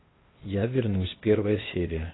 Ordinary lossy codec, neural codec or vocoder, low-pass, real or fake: AAC, 16 kbps; codec, 16 kHz, 0.8 kbps, ZipCodec; 7.2 kHz; fake